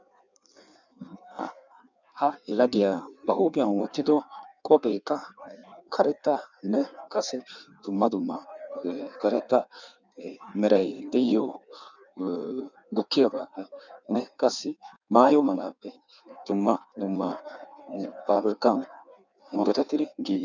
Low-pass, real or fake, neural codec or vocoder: 7.2 kHz; fake; codec, 16 kHz in and 24 kHz out, 1.1 kbps, FireRedTTS-2 codec